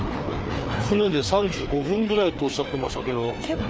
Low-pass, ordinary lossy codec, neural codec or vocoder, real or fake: none; none; codec, 16 kHz, 4 kbps, FreqCodec, larger model; fake